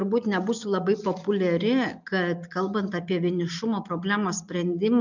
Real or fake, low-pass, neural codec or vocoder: real; 7.2 kHz; none